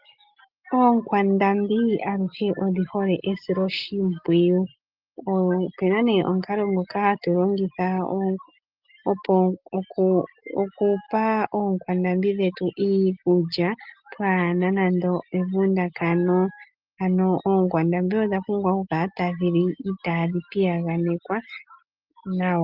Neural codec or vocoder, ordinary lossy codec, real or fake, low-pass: none; Opus, 32 kbps; real; 5.4 kHz